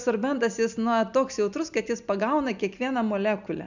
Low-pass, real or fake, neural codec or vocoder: 7.2 kHz; real; none